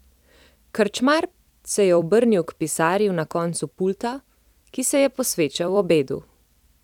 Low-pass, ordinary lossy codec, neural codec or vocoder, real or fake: 19.8 kHz; none; vocoder, 44.1 kHz, 128 mel bands every 256 samples, BigVGAN v2; fake